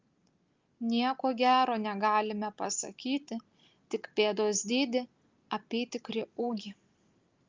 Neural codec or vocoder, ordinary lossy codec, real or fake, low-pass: none; Opus, 32 kbps; real; 7.2 kHz